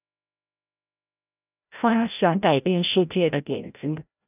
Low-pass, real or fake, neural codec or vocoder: 3.6 kHz; fake; codec, 16 kHz, 0.5 kbps, FreqCodec, larger model